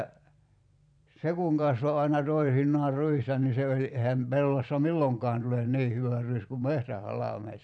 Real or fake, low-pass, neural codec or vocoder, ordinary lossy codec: fake; 9.9 kHz; vocoder, 44.1 kHz, 128 mel bands every 512 samples, BigVGAN v2; none